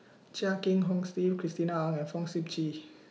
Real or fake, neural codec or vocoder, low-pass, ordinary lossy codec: real; none; none; none